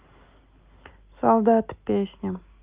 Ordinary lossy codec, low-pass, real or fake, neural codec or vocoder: Opus, 32 kbps; 3.6 kHz; real; none